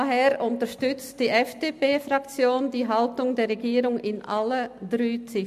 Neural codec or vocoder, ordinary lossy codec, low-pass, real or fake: none; MP3, 64 kbps; 14.4 kHz; real